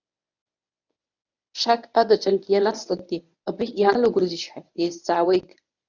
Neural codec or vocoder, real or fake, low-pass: codec, 24 kHz, 0.9 kbps, WavTokenizer, medium speech release version 1; fake; 7.2 kHz